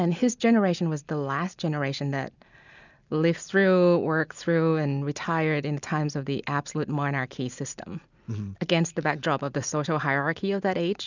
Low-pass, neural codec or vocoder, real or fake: 7.2 kHz; none; real